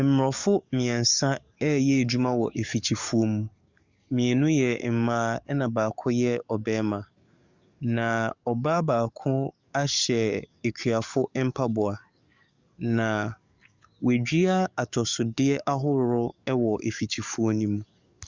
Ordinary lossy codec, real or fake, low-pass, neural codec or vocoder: Opus, 64 kbps; fake; 7.2 kHz; codec, 44.1 kHz, 7.8 kbps, DAC